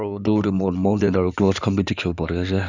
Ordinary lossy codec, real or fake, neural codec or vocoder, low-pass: none; fake; codec, 16 kHz in and 24 kHz out, 2.2 kbps, FireRedTTS-2 codec; 7.2 kHz